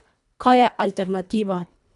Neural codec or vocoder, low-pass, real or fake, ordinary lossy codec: codec, 24 kHz, 1.5 kbps, HILCodec; 10.8 kHz; fake; none